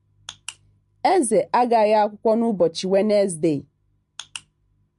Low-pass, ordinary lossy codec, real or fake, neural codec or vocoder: 14.4 kHz; MP3, 48 kbps; real; none